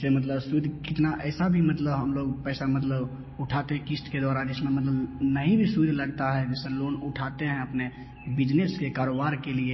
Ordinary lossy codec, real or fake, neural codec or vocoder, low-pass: MP3, 24 kbps; real; none; 7.2 kHz